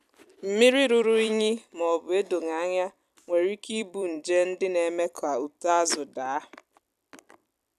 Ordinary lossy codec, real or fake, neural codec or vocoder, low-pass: none; fake; vocoder, 44.1 kHz, 128 mel bands every 256 samples, BigVGAN v2; 14.4 kHz